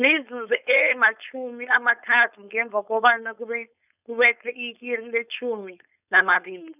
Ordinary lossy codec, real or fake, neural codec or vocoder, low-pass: none; fake; codec, 16 kHz, 4.8 kbps, FACodec; 3.6 kHz